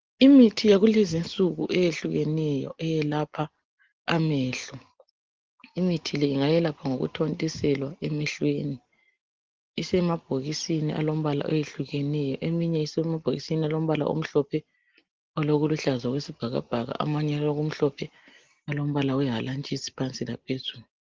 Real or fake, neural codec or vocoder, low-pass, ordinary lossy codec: real; none; 7.2 kHz; Opus, 16 kbps